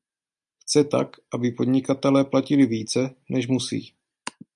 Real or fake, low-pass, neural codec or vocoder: real; 10.8 kHz; none